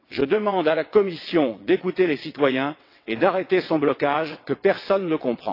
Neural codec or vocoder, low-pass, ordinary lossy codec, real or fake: vocoder, 22.05 kHz, 80 mel bands, WaveNeXt; 5.4 kHz; AAC, 32 kbps; fake